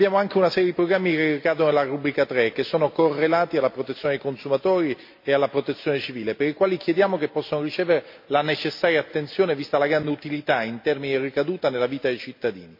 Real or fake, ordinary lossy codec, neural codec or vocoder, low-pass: real; MP3, 32 kbps; none; 5.4 kHz